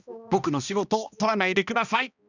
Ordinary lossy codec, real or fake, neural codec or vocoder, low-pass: none; fake; codec, 16 kHz, 1 kbps, X-Codec, HuBERT features, trained on balanced general audio; 7.2 kHz